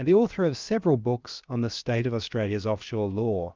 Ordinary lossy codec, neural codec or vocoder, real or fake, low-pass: Opus, 24 kbps; codec, 16 kHz, about 1 kbps, DyCAST, with the encoder's durations; fake; 7.2 kHz